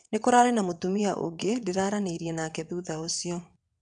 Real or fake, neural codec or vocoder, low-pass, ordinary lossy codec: fake; vocoder, 22.05 kHz, 80 mel bands, WaveNeXt; 9.9 kHz; none